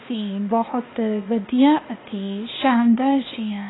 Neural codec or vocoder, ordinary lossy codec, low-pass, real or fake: codec, 16 kHz, 0.8 kbps, ZipCodec; AAC, 16 kbps; 7.2 kHz; fake